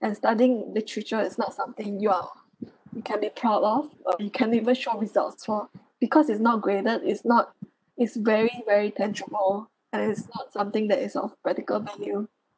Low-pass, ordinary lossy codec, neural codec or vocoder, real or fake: none; none; none; real